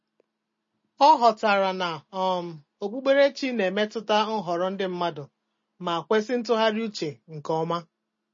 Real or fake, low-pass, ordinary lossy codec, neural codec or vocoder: real; 7.2 kHz; MP3, 32 kbps; none